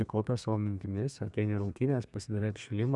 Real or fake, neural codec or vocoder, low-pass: fake; codec, 32 kHz, 1.9 kbps, SNAC; 10.8 kHz